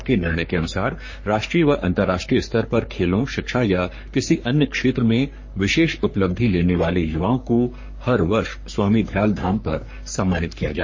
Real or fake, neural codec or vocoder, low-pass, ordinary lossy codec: fake; codec, 44.1 kHz, 3.4 kbps, Pupu-Codec; 7.2 kHz; MP3, 32 kbps